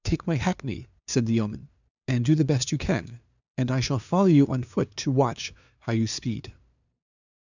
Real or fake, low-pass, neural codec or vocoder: fake; 7.2 kHz; codec, 16 kHz, 2 kbps, FunCodec, trained on LibriTTS, 25 frames a second